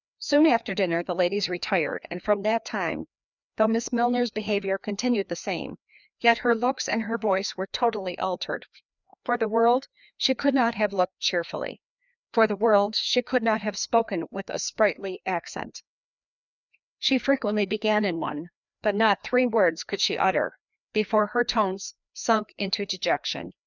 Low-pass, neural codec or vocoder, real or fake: 7.2 kHz; codec, 16 kHz, 2 kbps, FreqCodec, larger model; fake